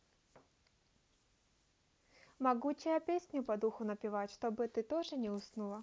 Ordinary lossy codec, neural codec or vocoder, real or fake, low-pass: none; none; real; none